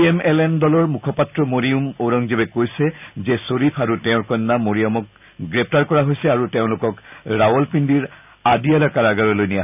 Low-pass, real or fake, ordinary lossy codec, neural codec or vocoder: 3.6 kHz; real; none; none